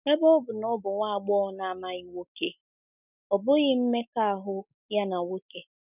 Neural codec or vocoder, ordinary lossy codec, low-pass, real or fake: none; none; 3.6 kHz; real